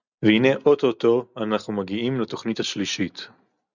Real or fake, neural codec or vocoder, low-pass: real; none; 7.2 kHz